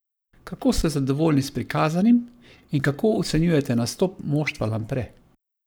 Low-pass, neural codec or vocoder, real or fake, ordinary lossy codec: none; codec, 44.1 kHz, 7.8 kbps, Pupu-Codec; fake; none